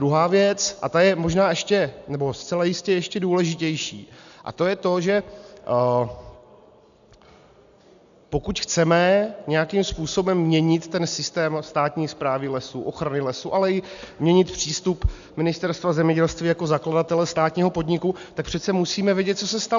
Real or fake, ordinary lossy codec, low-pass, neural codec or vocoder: real; AAC, 96 kbps; 7.2 kHz; none